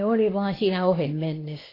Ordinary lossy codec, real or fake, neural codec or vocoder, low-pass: AAC, 24 kbps; fake; codec, 24 kHz, 0.9 kbps, WavTokenizer, medium speech release version 2; 5.4 kHz